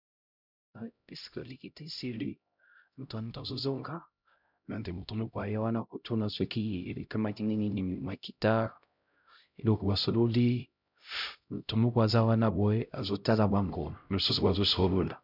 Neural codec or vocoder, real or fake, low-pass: codec, 16 kHz, 0.5 kbps, X-Codec, HuBERT features, trained on LibriSpeech; fake; 5.4 kHz